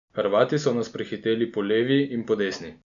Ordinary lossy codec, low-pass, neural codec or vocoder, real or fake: none; 7.2 kHz; none; real